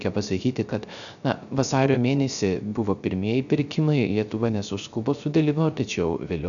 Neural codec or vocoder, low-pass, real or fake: codec, 16 kHz, 0.3 kbps, FocalCodec; 7.2 kHz; fake